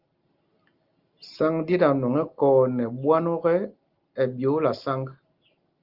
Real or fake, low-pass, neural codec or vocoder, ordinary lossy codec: real; 5.4 kHz; none; Opus, 32 kbps